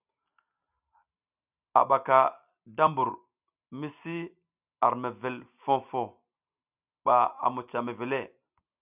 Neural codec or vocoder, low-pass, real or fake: none; 3.6 kHz; real